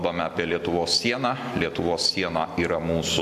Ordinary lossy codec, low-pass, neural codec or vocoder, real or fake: AAC, 96 kbps; 14.4 kHz; none; real